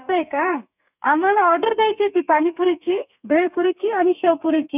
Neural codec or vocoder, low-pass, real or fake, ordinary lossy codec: codec, 32 kHz, 1.9 kbps, SNAC; 3.6 kHz; fake; none